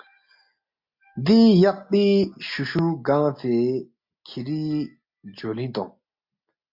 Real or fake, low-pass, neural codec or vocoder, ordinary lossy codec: real; 5.4 kHz; none; MP3, 48 kbps